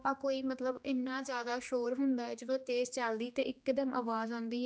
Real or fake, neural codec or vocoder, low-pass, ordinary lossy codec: fake; codec, 16 kHz, 2 kbps, X-Codec, HuBERT features, trained on general audio; none; none